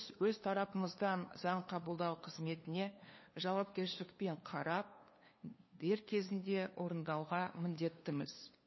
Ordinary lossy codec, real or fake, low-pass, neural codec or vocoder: MP3, 24 kbps; fake; 7.2 kHz; codec, 16 kHz, 2 kbps, FunCodec, trained on LibriTTS, 25 frames a second